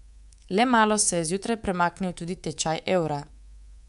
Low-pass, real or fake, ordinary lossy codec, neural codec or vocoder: 10.8 kHz; fake; none; codec, 24 kHz, 3.1 kbps, DualCodec